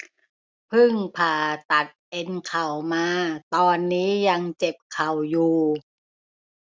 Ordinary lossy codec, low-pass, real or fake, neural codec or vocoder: none; none; real; none